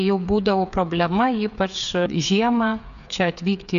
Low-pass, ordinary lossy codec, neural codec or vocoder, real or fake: 7.2 kHz; MP3, 96 kbps; codec, 16 kHz, 8 kbps, FreqCodec, smaller model; fake